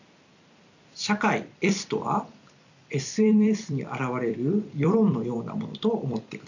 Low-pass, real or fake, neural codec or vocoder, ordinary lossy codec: 7.2 kHz; fake; vocoder, 44.1 kHz, 128 mel bands every 256 samples, BigVGAN v2; none